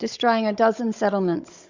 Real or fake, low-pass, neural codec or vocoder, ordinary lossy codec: fake; 7.2 kHz; codec, 16 kHz, 16 kbps, FunCodec, trained on Chinese and English, 50 frames a second; Opus, 64 kbps